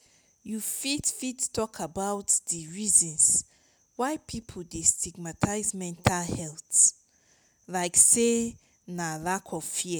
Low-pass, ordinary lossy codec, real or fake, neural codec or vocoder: none; none; real; none